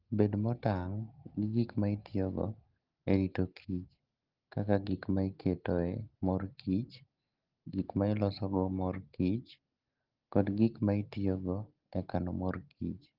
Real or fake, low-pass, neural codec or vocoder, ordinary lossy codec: real; 5.4 kHz; none; Opus, 16 kbps